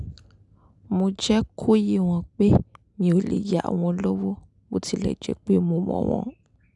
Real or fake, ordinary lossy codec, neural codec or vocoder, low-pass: real; none; none; 10.8 kHz